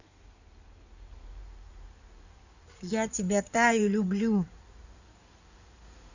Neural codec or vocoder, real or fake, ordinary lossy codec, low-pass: codec, 16 kHz in and 24 kHz out, 2.2 kbps, FireRedTTS-2 codec; fake; none; 7.2 kHz